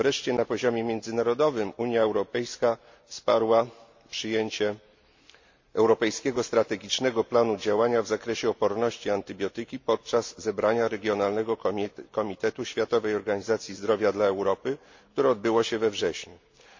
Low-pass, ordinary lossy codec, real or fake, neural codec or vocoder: 7.2 kHz; MP3, 48 kbps; real; none